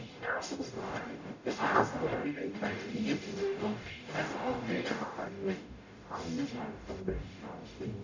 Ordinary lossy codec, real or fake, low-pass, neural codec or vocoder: none; fake; 7.2 kHz; codec, 44.1 kHz, 0.9 kbps, DAC